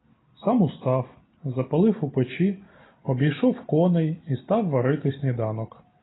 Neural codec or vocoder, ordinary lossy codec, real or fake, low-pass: none; AAC, 16 kbps; real; 7.2 kHz